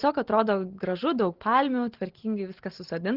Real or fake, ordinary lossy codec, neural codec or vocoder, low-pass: real; Opus, 16 kbps; none; 5.4 kHz